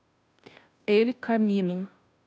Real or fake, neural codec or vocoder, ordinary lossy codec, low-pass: fake; codec, 16 kHz, 0.5 kbps, FunCodec, trained on Chinese and English, 25 frames a second; none; none